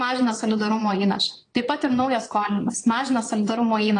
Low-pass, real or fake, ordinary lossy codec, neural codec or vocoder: 9.9 kHz; fake; AAC, 32 kbps; vocoder, 22.05 kHz, 80 mel bands, Vocos